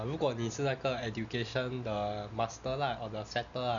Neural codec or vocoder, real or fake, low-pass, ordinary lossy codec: none; real; 7.2 kHz; MP3, 96 kbps